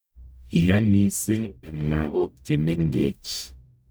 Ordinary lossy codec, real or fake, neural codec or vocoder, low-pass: none; fake; codec, 44.1 kHz, 0.9 kbps, DAC; none